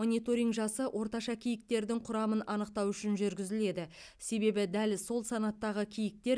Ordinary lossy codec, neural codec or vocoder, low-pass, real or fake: none; none; none; real